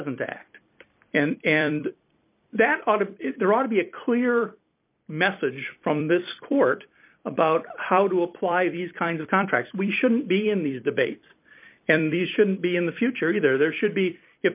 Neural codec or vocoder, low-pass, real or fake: vocoder, 44.1 kHz, 128 mel bands every 256 samples, BigVGAN v2; 3.6 kHz; fake